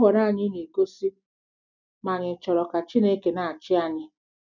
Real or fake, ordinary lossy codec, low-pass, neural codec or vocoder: real; none; 7.2 kHz; none